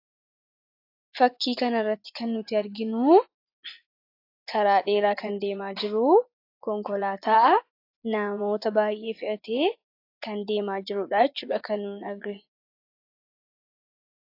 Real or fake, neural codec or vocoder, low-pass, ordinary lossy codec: fake; vocoder, 24 kHz, 100 mel bands, Vocos; 5.4 kHz; AAC, 32 kbps